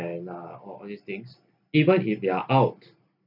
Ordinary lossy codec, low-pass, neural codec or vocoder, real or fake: none; 5.4 kHz; none; real